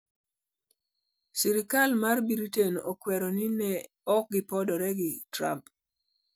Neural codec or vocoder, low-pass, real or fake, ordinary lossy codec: none; none; real; none